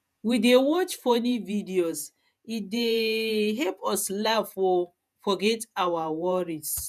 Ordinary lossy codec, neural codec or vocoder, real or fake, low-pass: none; vocoder, 48 kHz, 128 mel bands, Vocos; fake; 14.4 kHz